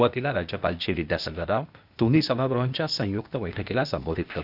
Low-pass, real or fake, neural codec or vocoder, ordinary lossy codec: 5.4 kHz; fake; codec, 16 kHz, 0.8 kbps, ZipCodec; none